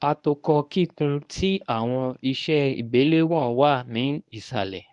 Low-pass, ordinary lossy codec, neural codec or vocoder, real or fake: 10.8 kHz; none; codec, 24 kHz, 0.9 kbps, WavTokenizer, medium speech release version 1; fake